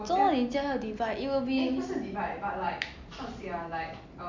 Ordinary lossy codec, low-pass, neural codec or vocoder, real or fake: MP3, 64 kbps; 7.2 kHz; none; real